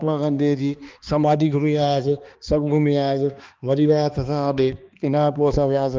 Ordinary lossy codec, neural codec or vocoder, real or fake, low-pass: Opus, 32 kbps; codec, 16 kHz, 2 kbps, X-Codec, HuBERT features, trained on balanced general audio; fake; 7.2 kHz